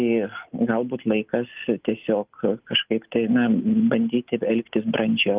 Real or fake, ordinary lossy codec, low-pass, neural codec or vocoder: real; Opus, 32 kbps; 3.6 kHz; none